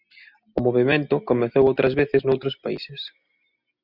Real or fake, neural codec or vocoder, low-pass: real; none; 5.4 kHz